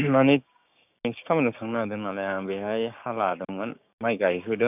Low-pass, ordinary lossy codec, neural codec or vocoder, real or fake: 3.6 kHz; none; codec, 16 kHz, 6 kbps, DAC; fake